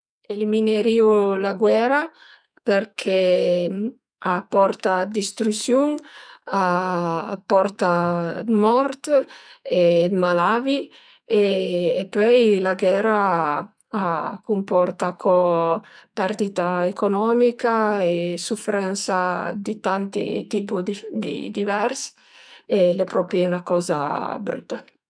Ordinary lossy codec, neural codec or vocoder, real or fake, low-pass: none; codec, 44.1 kHz, 2.6 kbps, SNAC; fake; 9.9 kHz